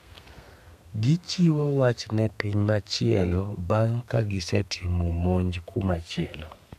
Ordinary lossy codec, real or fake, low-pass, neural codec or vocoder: none; fake; 14.4 kHz; codec, 32 kHz, 1.9 kbps, SNAC